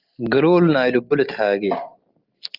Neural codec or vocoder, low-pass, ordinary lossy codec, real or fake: none; 5.4 kHz; Opus, 24 kbps; real